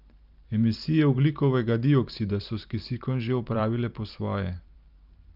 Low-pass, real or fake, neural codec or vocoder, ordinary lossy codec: 5.4 kHz; fake; vocoder, 44.1 kHz, 128 mel bands every 512 samples, BigVGAN v2; Opus, 24 kbps